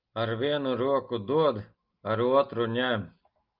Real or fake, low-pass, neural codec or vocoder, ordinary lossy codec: real; 5.4 kHz; none; Opus, 24 kbps